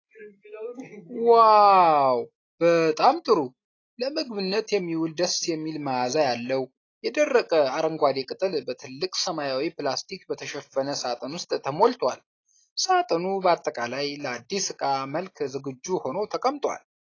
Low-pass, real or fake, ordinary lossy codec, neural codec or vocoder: 7.2 kHz; real; AAC, 32 kbps; none